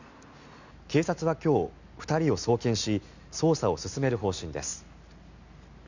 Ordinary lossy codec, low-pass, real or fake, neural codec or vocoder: none; 7.2 kHz; real; none